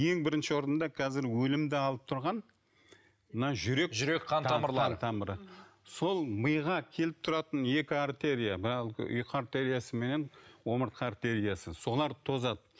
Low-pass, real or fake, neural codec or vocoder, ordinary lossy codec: none; real; none; none